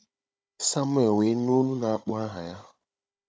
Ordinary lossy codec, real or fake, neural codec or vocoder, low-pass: none; fake; codec, 16 kHz, 16 kbps, FunCodec, trained on Chinese and English, 50 frames a second; none